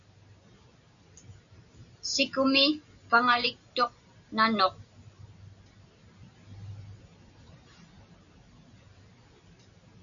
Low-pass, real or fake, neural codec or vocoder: 7.2 kHz; real; none